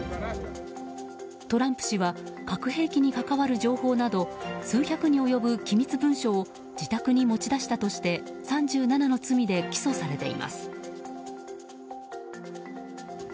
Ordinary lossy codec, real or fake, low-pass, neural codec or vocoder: none; real; none; none